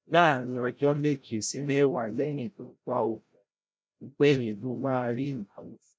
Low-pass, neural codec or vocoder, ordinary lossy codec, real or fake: none; codec, 16 kHz, 0.5 kbps, FreqCodec, larger model; none; fake